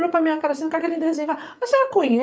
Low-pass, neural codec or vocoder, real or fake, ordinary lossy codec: none; codec, 16 kHz, 8 kbps, FreqCodec, larger model; fake; none